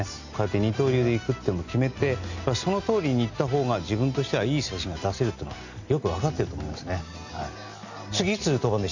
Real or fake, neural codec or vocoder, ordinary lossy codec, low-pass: real; none; none; 7.2 kHz